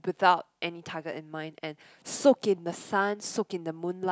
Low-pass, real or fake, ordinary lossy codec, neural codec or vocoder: none; real; none; none